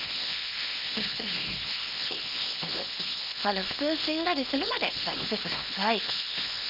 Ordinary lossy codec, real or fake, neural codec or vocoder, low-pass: none; fake; codec, 24 kHz, 0.9 kbps, WavTokenizer, small release; 5.4 kHz